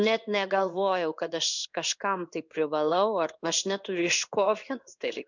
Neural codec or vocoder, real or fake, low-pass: codec, 16 kHz in and 24 kHz out, 1 kbps, XY-Tokenizer; fake; 7.2 kHz